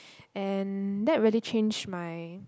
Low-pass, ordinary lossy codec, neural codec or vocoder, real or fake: none; none; none; real